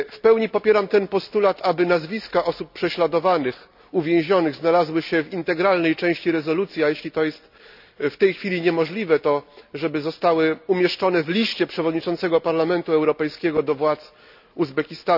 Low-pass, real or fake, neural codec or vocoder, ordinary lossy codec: 5.4 kHz; real; none; none